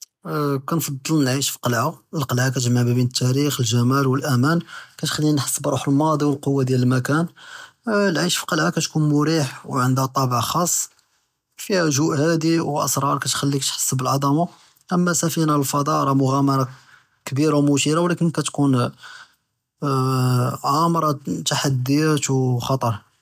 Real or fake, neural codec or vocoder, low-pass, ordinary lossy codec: real; none; 14.4 kHz; none